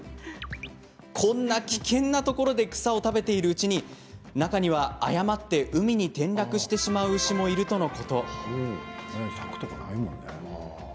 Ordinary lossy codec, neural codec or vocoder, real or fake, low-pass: none; none; real; none